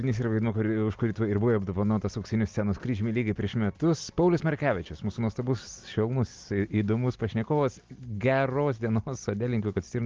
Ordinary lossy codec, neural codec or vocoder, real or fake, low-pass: Opus, 24 kbps; none; real; 7.2 kHz